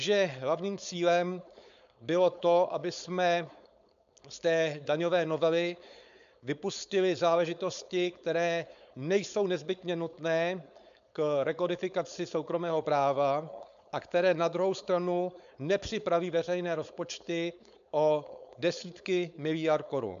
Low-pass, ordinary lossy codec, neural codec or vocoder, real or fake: 7.2 kHz; AAC, 96 kbps; codec, 16 kHz, 4.8 kbps, FACodec; fake